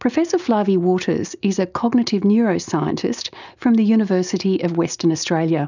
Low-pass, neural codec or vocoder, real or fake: 7.2 kHz; none; real